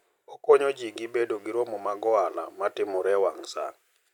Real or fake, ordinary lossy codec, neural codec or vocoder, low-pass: real; none; none; 19.8 kHz